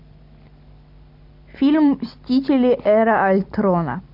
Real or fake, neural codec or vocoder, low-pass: real; none; 5.4 kHz